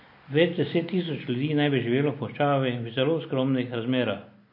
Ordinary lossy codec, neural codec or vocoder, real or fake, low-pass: MP3, 32 kbps; none; real; 5.4 kHz